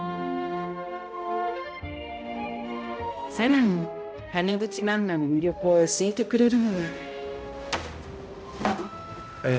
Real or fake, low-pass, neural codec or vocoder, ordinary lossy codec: fake; none; codec, 16 kHz, 0.5 kbps, X-Codec, HuBERT features, trained on balanced general audio; none